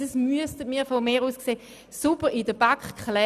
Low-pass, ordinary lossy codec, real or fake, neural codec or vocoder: 14.4 kHz; none; real; none